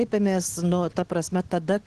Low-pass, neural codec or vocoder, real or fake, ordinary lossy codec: 10.8 kHz; none; real; Opus, 16 kbps